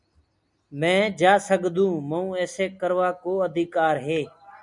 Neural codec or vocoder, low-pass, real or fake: none; 10.8 kHz; real